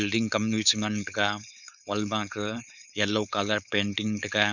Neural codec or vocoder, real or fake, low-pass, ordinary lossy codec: codec, 16 kHz, 4.8 kbps, FACodec; fake; 7.2 kHz; none